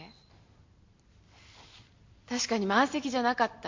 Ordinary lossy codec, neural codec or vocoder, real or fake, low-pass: MP3, 48 kbps; none; real; 7.2 kHz